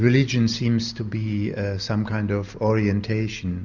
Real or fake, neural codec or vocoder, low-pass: real; none; 7.2 kHz